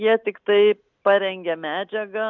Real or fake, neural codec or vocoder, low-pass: real; none; 7.2 kHz